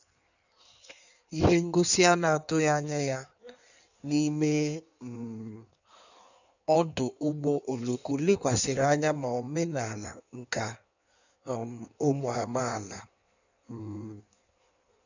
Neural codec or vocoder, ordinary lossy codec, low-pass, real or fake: codec, 16 kHz in and 24 kHz out, 1.1 kbps, FireRedTTS-2 codec; none; 7.2 kHz; fake